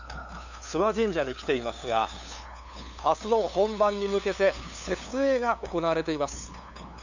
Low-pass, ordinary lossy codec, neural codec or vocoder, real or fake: 7.2 kHz; none; codec, 16 kHz, 2 kbps, FunCodec, trained on LibriTTS, 25 frames a second; fake